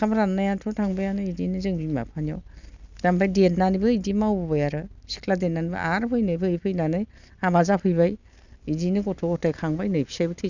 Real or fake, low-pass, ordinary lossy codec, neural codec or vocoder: real; 7.2 kHz; none; none